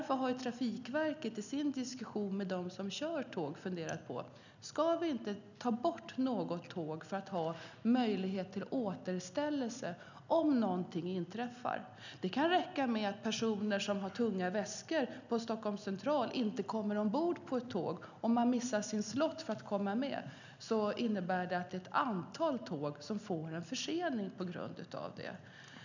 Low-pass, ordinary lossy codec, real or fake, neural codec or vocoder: 7.2 kHz; none; real; none